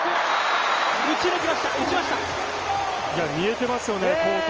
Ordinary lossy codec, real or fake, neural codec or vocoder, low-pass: Opus, 24 kbps; real; none; 7.2 kHz